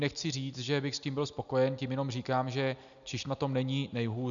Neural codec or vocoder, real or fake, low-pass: none; real; 7.2 kHz